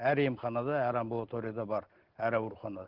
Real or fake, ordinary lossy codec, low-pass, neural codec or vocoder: real; Opus, 16 kbps; 5.4 kHz; none